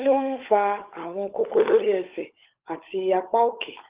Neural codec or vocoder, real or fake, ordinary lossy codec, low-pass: codec, 16 kHz, 16 kbps, FunCodec, trained on LibriTTS, 50 frames a second; fake; Opus, 32 kbps; 3.6 kHz